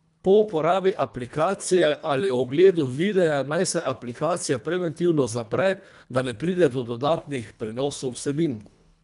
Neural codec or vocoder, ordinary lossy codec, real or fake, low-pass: codec, 24 kHz, 1.5 kbps, HILCodec; none; fake; 10.8 kHz